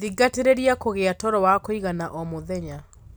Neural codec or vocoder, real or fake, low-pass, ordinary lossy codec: none; real; none; none